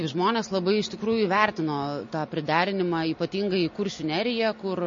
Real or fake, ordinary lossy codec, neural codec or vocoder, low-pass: real; MP3, 32 kbps; none; 7.2 kHz